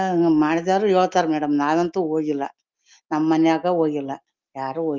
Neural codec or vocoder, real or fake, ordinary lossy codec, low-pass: none; real; Opus, 32 kbps; 7.2 kHz